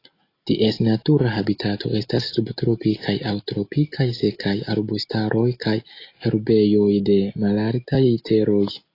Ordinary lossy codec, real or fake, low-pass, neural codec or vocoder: AAC, 32 kbps; real; 5.4 kHz; none